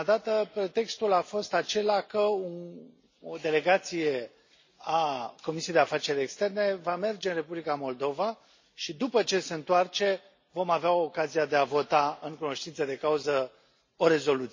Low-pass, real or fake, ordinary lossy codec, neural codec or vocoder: 7.2 kHz; real; MP3, 32 kbps; none